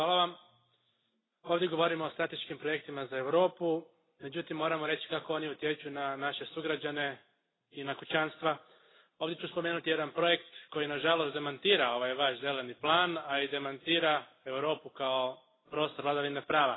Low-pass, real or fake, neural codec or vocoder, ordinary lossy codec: 7.2 kHz; real; none; AAC, 16 kbps